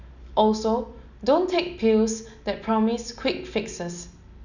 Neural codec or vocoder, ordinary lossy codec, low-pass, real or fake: none; none; 7.2 kHz; real